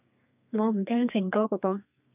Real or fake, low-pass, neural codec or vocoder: fake; 3.6 kHz; codec, 32 kHz, 1.9 kbps, SNAC